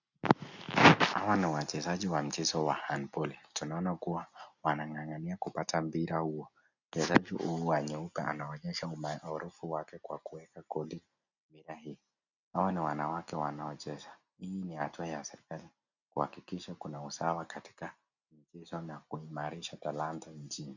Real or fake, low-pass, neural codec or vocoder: real; 7.2 kHz; none